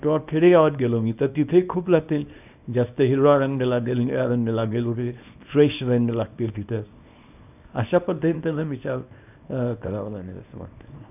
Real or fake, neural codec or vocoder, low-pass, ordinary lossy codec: fake; codec, 24 kHz, 0.9 kbps, WavTokenizer, small release; 3.6 kHz; none